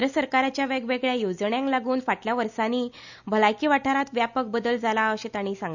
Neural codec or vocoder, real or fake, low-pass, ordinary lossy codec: none; real; 7.2 kHz; none